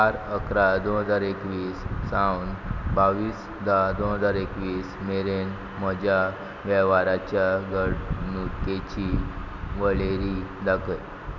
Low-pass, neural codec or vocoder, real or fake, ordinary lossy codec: 7.2 kHz; none; real; none